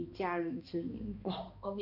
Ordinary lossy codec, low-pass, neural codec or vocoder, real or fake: none; 5.4 kHz; codec, 16 kHz, 2 kbps, X-Codec, HuBERT features, trained on balanced general audio; fake